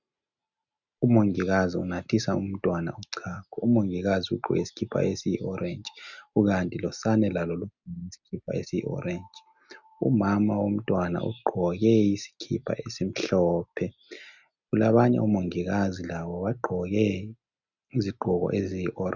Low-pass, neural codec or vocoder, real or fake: 7.2 kHz; none; real